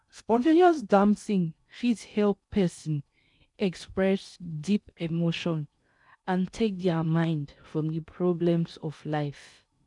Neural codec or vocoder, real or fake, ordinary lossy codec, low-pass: codec, 16 kHz in and 24 kHz out, 0.6 kbps, FocalCodec, streaming, 2048 codes; fake; none; 10.8 kHz